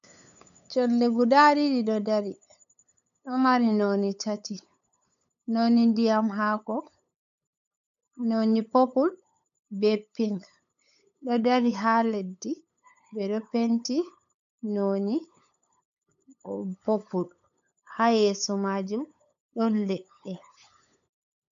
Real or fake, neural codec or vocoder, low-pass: fake; codec, 16 kHz, 8 kbps, FunCodec, trained on LibriTTS, 25 frames a second; 7.2 kHz